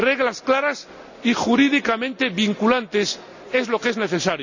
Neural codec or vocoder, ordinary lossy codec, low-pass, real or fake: none; none; 7.2 kHz; real